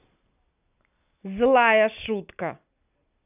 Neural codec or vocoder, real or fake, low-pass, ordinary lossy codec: none; real; 3.6 kHz; none